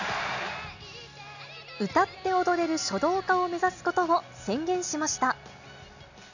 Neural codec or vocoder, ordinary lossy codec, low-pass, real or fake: none; none; 7.2 kHz; real